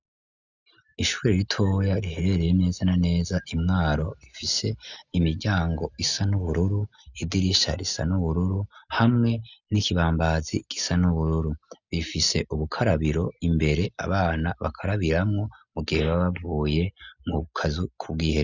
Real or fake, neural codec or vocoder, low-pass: real; none; 7.2 kHz